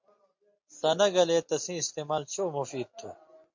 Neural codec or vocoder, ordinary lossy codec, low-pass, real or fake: none; MP3, 48 kbps; 7.2 kHz; real